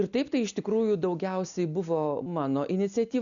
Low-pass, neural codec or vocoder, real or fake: 7.2 kHz; none; real